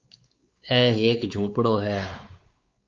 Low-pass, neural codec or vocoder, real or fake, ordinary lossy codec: 7.2 kHz; codec, 16 kHz, 4 kbps, X-Codec, WavLM features, trained on Multilingual LibriSpeech; fake; Opus, 24 kbps